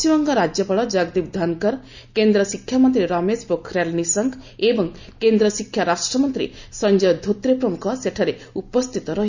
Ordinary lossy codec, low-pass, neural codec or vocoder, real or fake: Opus, 64 kbps; 7.2 kHz; none; real